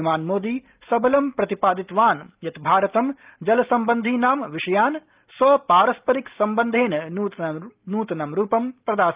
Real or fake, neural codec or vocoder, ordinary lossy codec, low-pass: real; none; Opus, 32 kbps; 3.6 kHz